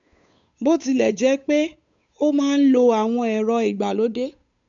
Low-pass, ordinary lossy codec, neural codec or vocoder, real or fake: 7.2 kHz; none; codec, 16 kHz, 8 kbps, FunCodec, trained on Chinese and English, 25 frames a second; fake